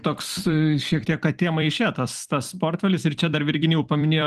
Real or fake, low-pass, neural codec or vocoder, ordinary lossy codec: fake; 14.4 kHz; vocoder, 44.1 kHz, 128 mel bands every 256 samples, BigVGAN v2; Opus, 24 kbps